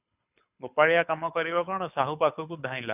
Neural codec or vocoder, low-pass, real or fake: codec, 24 kHz, 6 kbps, HILCodec; 3.6 kHz; fake